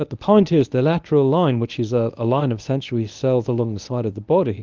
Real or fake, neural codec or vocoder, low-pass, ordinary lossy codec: fake; codec, 24 kHz, 0.9 kbps, WavTokenizer, medium speech release version 1; 7.2 kHz; Opus, 32 kbps